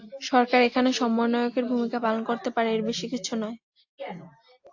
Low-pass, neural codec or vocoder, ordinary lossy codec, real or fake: 7.2 kHz; none; AAC, 48 kbps; real